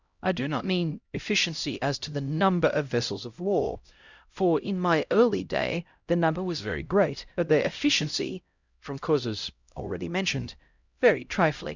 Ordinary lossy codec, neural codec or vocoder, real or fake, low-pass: Opus, 64 kbps; codec, 16 kHz, 0.5 kbps, X-Codec, HuBERT features, trained on LibriSpeech; fake; 7.2 kHz